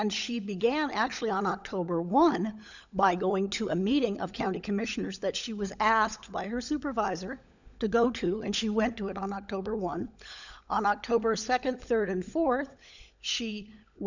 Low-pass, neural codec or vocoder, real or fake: 7.2 kHz; codec, 16 kHz, 16 kbps, FunCodec, trained on LibriTTS, 50 frames a second; fake